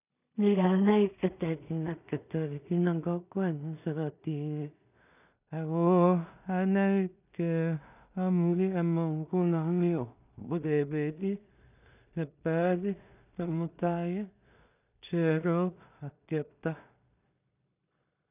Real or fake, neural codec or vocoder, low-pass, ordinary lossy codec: fake; codec, 16 kHz in and 24 kHz out, 0.4 kbps, LongCat-Audio-Codec, two codebook decoder; 3.6 kHz; none